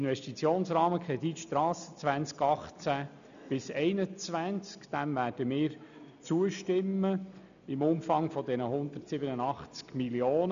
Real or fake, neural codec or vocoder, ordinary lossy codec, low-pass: real; none; none; 7.2 kHz